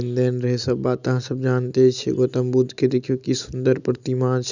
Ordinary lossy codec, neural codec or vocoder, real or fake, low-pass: none; none; real; 7.2 kHz